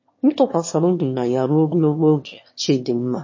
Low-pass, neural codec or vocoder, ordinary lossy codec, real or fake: 7.2 kHz; autoencoder, 22.05 kHz, a latent of 192 numbers a frame, VITS, trained on one speaker; MP3, 32 kbps; fake